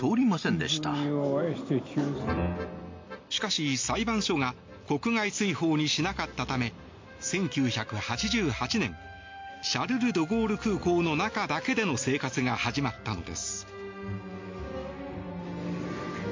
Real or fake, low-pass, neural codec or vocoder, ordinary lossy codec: real; 7.2 kHz; none; MP3, 48 kbps